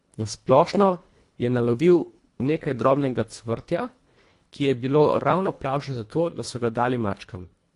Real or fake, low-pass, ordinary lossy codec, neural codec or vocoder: fake; 10.8 kHz; AAC, 48 kbps; codec, 24 kHz, 1.5 kbps, HILCodec